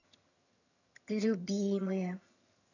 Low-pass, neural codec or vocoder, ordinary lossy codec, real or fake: 7.2 kHz; vocoder, 22.05 kHz, 80 mel bands, HiFi-GAN; none; fake